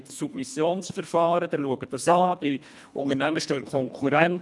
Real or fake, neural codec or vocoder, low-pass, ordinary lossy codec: fake; codec, 24 kHz, 1.5 kbps, HILCodec; none; none